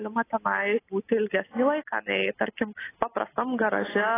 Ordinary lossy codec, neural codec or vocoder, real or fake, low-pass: AAC, 16 kbps; none; real; 3.6 kHz